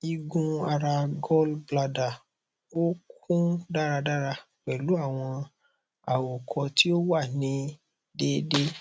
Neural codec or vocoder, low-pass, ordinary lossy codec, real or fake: none; none; none; real